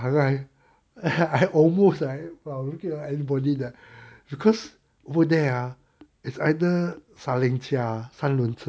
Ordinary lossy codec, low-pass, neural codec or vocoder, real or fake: none; none; none; real